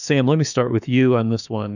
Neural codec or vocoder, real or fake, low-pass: autoencoder, 48 kHz, 32 numbers a frame, DAC-VAE, trained on Japanese speech; fake; 7.2 kHz